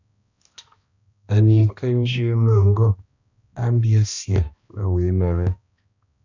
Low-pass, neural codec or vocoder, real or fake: 7.2 kHz; codec, 16 kHz, 1 kbps, X-Codec, HuBERT features, trained on balanced general audio; fake